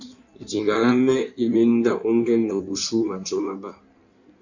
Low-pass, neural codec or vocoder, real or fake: 7.2 kHz; codec, 16 kHz in and 24 kHz out, 1.1 kbps, FireRedTTS-2 codec; fake